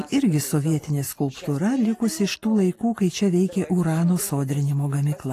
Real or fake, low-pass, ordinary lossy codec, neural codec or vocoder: real; 14.4 kHz; AAC, 48 kbps; none